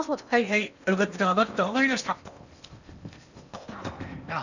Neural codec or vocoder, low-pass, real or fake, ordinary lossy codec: codec, 16 kHz in and 24 kHz out, 0.8 kbps, FocalCodec, streaming, 65536 codes; 7.2 kHz; fake; none